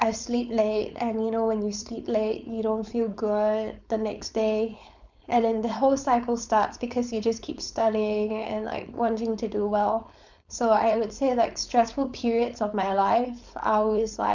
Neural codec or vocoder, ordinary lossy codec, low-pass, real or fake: codec, 16 kHz, 4.8 kbps, FACodec; none; 7.2 kHz; fake